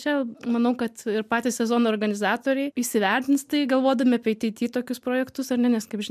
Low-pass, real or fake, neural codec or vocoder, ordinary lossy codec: 14.4 kHz; real; none; MP3, 96 kbps